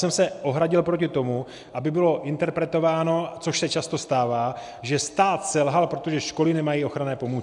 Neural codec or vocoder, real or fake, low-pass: none; real; 10.8 kHz